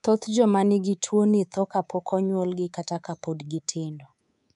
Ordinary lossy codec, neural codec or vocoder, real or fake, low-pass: none; codec, 24 kHz, 3.1 kbps, DualCodec; fake; 10.8 kHz